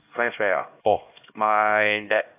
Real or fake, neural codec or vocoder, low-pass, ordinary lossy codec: fake; codec, 16 kHz, 1 kbps, X-Codec, HuBERT features, trained on LibriSpeech; 3.6 kHz; AAC, 32 kbps